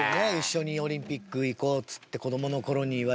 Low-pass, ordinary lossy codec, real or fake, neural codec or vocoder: none; none; real; none